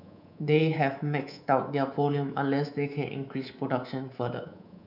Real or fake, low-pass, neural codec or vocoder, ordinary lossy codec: fake; 5.4 kHz; codec, 24 kHz, 3.1 kbps, DualCodec; none